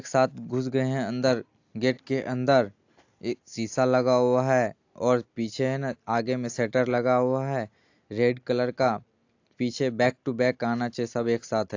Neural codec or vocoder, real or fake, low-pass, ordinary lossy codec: none; real; 7.2 kHz; AAC, 48 kbps